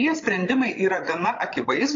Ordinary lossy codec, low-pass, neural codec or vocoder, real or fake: AAC, 32 kbps; 7.2 kHz; codec, 16 kHz, 16 kbps, FreqCodec, larger model; fake